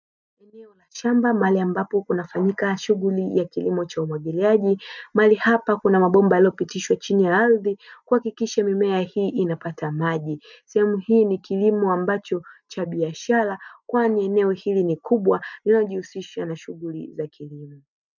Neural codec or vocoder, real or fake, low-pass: none; real; 7.2 kHz